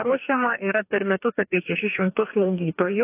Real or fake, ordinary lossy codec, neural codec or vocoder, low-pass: fake; AAC, 32 kbps; codec, 44.1 kHz, 2.6 kbps, DAC; 3.6 kHz